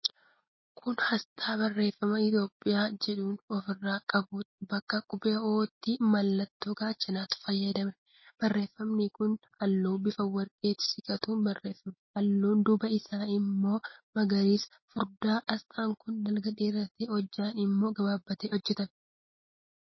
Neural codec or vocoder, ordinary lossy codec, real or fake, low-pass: none; MP3, 24 kbps; real; 7.2 kHz